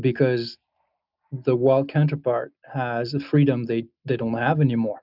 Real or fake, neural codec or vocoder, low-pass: real; none; 5.4 kHz